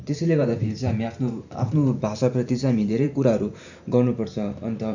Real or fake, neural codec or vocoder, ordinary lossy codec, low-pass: fake; vocoder, 44.1 kHz, 128 mel bands every 512 samples, BigVGAN v2; none; 7.2 kHz